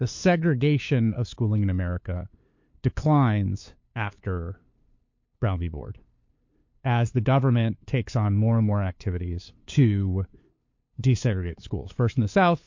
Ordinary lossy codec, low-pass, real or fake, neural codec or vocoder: MP3, 48 kbps; 7.2 kHz; fake; codec, 16 kHz, 2 kbps, FunCodec, trained on LibriTTS, 25 frames a second